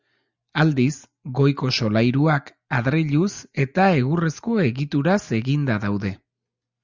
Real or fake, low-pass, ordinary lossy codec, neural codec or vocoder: real; 7.2 kHz; Opus, 64 kbps; none